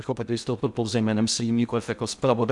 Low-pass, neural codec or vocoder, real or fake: 10.8 kHz; codec, 16 kHz in and 24 kHz out, 0.6 kbps, FocalCodec, streaming, 2048 codes; fake